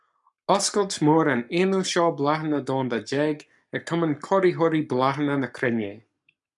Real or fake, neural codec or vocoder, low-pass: fake; codec, 44.1 kHz, 7.8 kbps, Pupu-Codec; 10.8 kHz